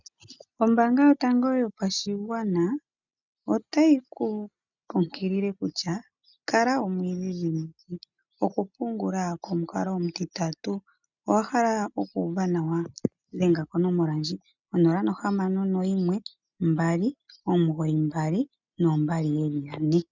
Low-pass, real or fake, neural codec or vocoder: 7.2 kHz; real; none